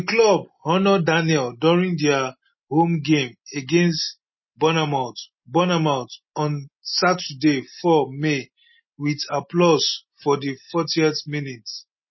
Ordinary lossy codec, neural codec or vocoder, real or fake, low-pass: MP3, 24 kbps; none; real; 7.2 kHz